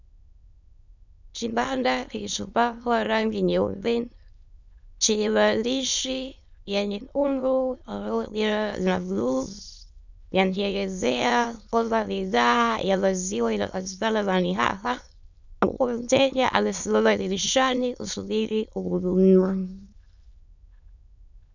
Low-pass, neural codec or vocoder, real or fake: 7.2 kHz; autoencoder, 22.05 kHz, a latent of 192 numbers a frame, VITS, trained on many speakers; fake